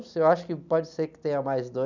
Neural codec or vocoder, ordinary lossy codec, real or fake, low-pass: none; none; real; 7.2 kHz